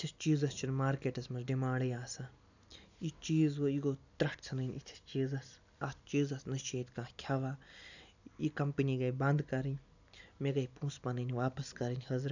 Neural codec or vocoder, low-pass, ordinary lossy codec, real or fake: none; 7.2 kHz; none; real